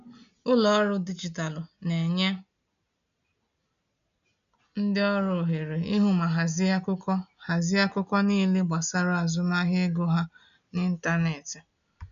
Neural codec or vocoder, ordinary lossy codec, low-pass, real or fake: none; none; 7.2 kHz; real